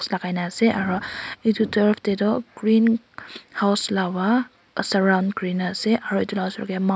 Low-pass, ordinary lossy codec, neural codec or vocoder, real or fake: none; none; none; real